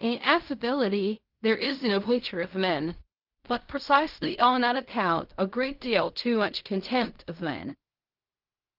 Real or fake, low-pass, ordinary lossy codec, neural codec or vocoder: fake; 5.4 kHz; Opus, 24 kbps; codec, 16 kHz in and 24 kHz out, 0.4 kbps, LongCat-Audio-Codec, fine tuned four codebook decoder